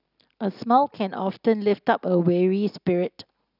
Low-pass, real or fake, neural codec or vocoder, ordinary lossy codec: 5.4 kHz; real; none; none